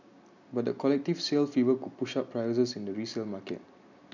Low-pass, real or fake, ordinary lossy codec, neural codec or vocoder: 7.2 kHz; real; none; none